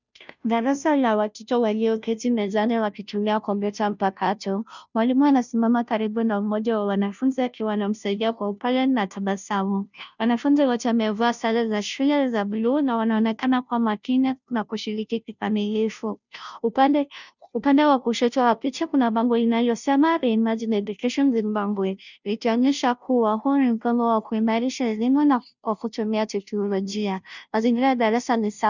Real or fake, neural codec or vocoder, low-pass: fake; codec, 16 kHz, 0.5 kbps, FunCodec, trained on Chinese and English, 25 frames a second; 7.2 kHz